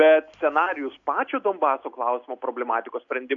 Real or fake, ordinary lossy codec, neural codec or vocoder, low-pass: real; AAC, 64 kbps; none; 7.2 kHz